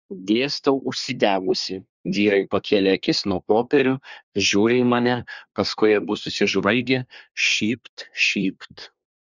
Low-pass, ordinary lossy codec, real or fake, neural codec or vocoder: 7.2 kHz; Opus, 64 kbps; fake; codec, 24 kHz, 1 kbps, SNAC